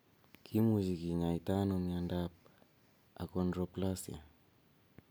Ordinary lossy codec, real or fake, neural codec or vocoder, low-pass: none; real; none; none